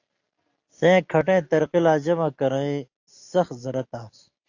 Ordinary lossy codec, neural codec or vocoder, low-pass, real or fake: AAC, 48 kbps; none; 7.2 kHz; real